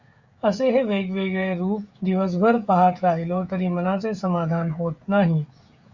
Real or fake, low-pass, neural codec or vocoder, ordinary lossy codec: fake; 7.2 kHz; codec, 16 kHz, 16 kbps, FreqCodec, smaller model; Opus, 64 kbps